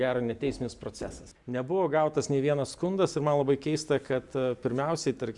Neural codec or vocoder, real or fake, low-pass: none; real; 10.8 kHz